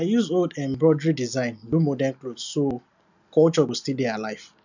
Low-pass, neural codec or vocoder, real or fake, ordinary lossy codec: 7.2 kHz; none; real; none